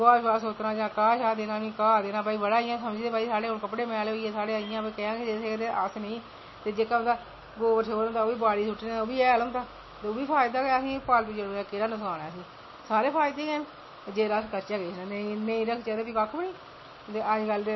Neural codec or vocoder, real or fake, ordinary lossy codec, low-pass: none; real; MP3, 24 kbps; 7.2 kHz